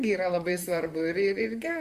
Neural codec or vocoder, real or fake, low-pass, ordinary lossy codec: vocoder, 44.1 kHz, 128 mel bands, Pupu-Vocoder; fake; 14.4 kHz; Opus, 64 kbps